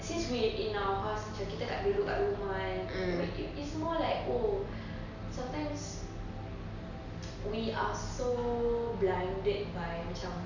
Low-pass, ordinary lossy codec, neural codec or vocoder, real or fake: 7.2 kHz; none; none; real